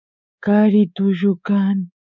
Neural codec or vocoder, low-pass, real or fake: codec, 24 kHz, 3.1 kbps, DualCodec; 7.2 kHz; fake